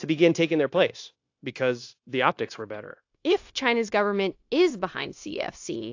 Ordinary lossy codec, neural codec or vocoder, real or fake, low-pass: AAC, 48 kbps; codec, 16 kHz, 0.9 kbps, LongCat-Audio-Codec; fake; 7.2 kHz